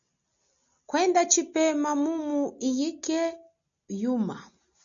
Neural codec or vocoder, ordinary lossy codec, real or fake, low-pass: none; MP3, 96 kbps; real; 7.2 kHz